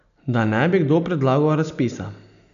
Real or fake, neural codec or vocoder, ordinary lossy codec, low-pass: real; none; none; 7.2 kHz